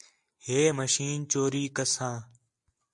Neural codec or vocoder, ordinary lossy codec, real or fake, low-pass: none; AAC, 64 kbps; real; 10.8 kHz